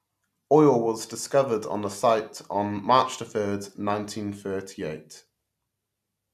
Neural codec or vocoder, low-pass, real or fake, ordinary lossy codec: none; 14.4 kHz; real; AAC, 96 kbps